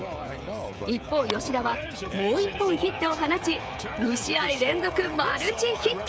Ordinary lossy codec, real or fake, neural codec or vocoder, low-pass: none; fake; codec, 16 kHz, 16 kbps, FreqCodec, smaller model; none